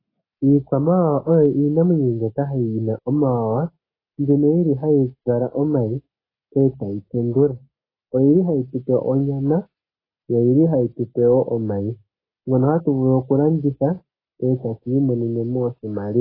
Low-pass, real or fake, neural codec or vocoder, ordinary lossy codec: 5.4 kHz; real; none; AAC, 24 kbps